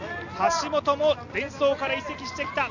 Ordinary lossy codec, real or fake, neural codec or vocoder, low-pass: none; real; none; 7.2 kHz